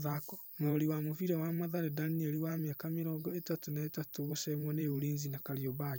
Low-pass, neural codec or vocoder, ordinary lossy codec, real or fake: none; vocoder, 44.1 kHz, 128 mel bands every 512 samples, BigVGAN v2; none; fake